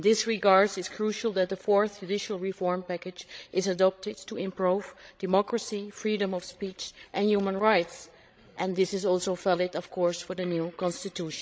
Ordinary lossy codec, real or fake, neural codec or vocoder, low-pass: none; fake; codec, 16 kHz, 16 kbps, FreqCodec, larger model; none